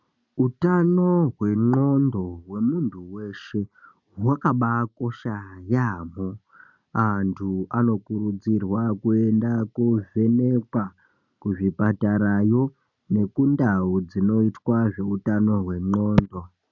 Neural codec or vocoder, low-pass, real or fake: none; 7.2 kHz; real